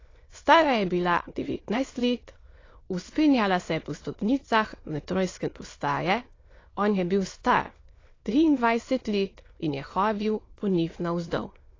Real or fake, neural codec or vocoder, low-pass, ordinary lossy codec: fake; autoencoder, 22.05 kHz, a latent of 192 numbers a frame, VITS, trained on many speakers; 7.2 kHz; AAC, 32 kbps